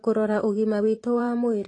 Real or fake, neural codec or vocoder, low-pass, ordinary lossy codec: fake; vocoder, 44.1 kHz, 128 mel bands every 512 samples, BigVGAN v2; 10.8 kHz; AAC, 32 kbps